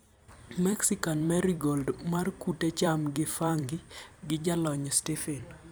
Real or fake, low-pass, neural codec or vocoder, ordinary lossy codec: fake; none; vocoder, 44.1 kHz, 128 mel bands every 256 samples, BigVGAN v2; none